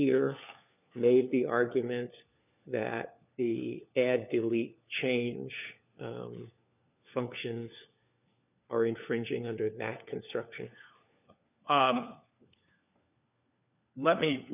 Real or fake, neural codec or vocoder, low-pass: fake; codec, 16 kHz, 4 kbps, FunCodec, trained on LibriTTS, 50 frames a second; 3.6 kHz